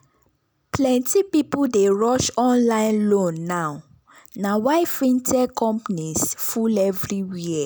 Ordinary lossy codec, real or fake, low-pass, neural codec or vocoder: none; real; none; none